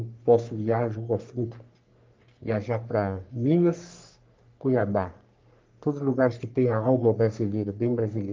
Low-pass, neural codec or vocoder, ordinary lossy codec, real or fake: 7.2 kHz; codec, 44.1 kHz, 3.4 kbps, Pupu-Codec; Opus, 32 kbps; fake